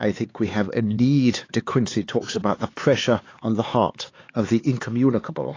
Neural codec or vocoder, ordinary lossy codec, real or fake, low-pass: codec, 16 kHz, 4 kbps, X-Codec, HuBERT features, trained on LibriSpeech; AAC, 32 kbps; fake; 7.2 kHz